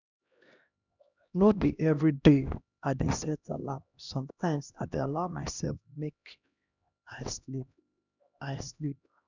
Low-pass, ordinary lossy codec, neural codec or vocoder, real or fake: 7.2 kHz; none; codec, 16 kHz, 1 kbps, X-Codec, HuBERT features, trained on LibriSpeech; fake